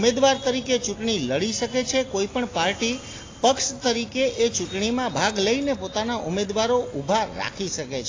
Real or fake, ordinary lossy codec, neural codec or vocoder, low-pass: real; AAC, 32 kbps; none; 7.2 kHz